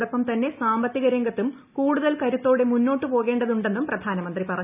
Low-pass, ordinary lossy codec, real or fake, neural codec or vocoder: 3.6 kHz; none; real; none